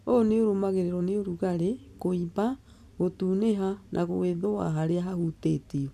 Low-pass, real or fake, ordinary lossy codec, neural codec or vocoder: 14.4 kHz; real; none; none